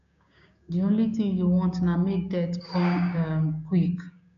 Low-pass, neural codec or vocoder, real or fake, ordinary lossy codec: 7.2 kHz; codec, 16 kHz, 6 kbps, DAC; fake; MP3, 96 kbps